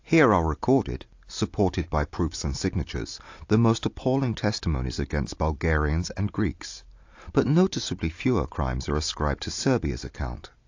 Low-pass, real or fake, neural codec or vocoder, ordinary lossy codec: 7.2 kHz; real; none; AAC, 48 kbps